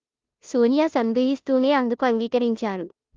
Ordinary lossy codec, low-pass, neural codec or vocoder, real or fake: Opus, 32 kbps; 7.2 kHz; codec, 16 kHz, 0.5 kbps, FunCodec, trained on Chinese and English, 25 frames a second; fake